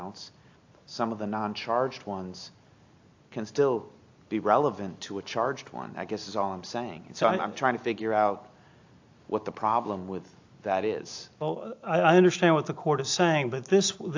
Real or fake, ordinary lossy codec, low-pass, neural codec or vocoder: real; AAC, 48 kbps; 7.2 kHz; none